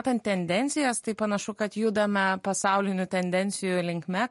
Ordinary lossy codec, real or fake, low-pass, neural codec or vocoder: MP3, 48 kbps; real; 14.4 kHz; none